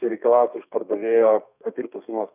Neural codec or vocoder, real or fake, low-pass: codec, 32 kHz, 1.9 kbps, SNAC; fake; 3.6 kHz